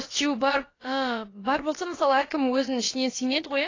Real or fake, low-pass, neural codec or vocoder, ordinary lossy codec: fake; 7.2 kHz; codec, 16 kHz, about 1 kbps, DyCAST, with the encoder's durations; AAC, 32 kbps